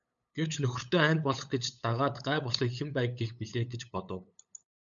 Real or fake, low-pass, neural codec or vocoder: fake; 7.2 kHz; codec, 16 kHz, 8 kbps, FunCodec, trained on LibriTTS, 25 frames a second